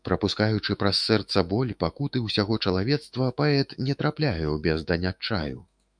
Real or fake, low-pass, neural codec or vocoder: fake; 9.9 kHz; autoencoder, 48 kHz, 128 numbers a frame, DAC-VAE, trained on Japanese speech